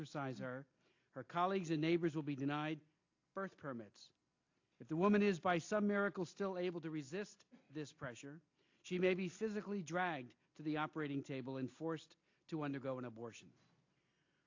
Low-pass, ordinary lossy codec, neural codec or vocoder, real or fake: 7.2 kHz; AAC, 48 kbps; none; real